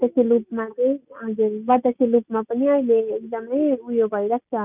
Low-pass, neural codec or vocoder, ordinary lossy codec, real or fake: 3.6 kHz; none; none; real